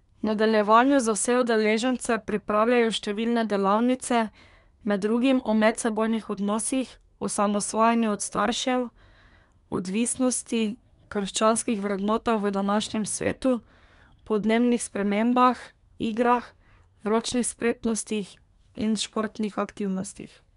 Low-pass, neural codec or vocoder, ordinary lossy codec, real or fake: 10.8 kHz; codec, 24 kHz, 1 kbps, SNAC; none; fake